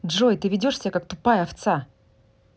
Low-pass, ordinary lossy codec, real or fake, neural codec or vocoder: none; none; real; none